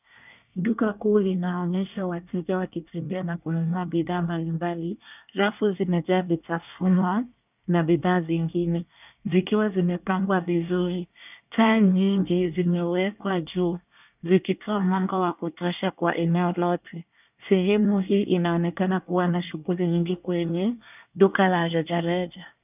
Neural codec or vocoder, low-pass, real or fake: codec, 24 kHz, 1 kbps, SNAC; 3.6 kHz; fake